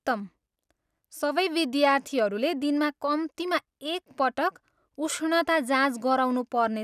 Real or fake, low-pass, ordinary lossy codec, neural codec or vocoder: real; 14.4 kHz; none; none